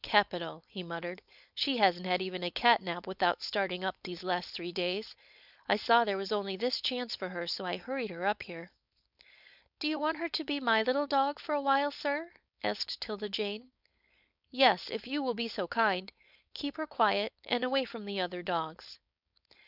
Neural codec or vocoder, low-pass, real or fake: codec, 16 kHz, 4.8 kbps, FACodec; 5.4 kHz; fake